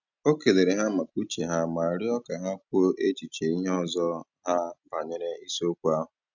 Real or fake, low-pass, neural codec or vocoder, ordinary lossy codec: real; 7.2 kHz; none; none